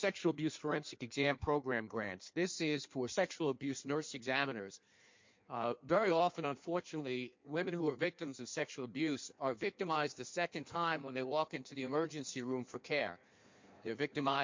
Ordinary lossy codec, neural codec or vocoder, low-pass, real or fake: MP3, 48 kbps; codec, 16 kHz in and 24 kHz out, 1.1 kbps, FireRedTTS-2 codec; 7.2 kHz; fake